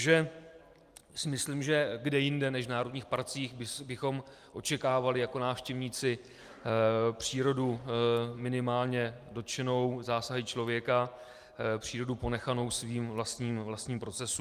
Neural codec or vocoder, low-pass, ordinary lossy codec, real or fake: none; 14.4 kHz; Opus, 32 kbps; real